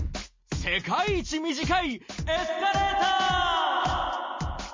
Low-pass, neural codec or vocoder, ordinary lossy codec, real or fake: 7.2 kHz; none; MP3, 32 kbps; real